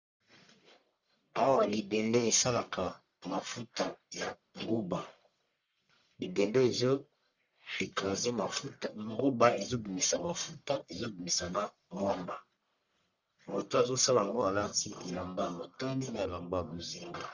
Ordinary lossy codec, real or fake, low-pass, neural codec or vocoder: Opus, 64 kbps; fake; 7.2 kHz; codec, 44.1 kHz, 1.7 kbps, Pupu-Codec